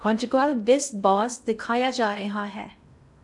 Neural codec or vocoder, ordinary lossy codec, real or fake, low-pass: codec, 16 kHz in and 24 kHz out, 0.6 kbps, FocalCodec, streaming, 2048 codes; MP3, 96 kbps; fake; 10.8 kHz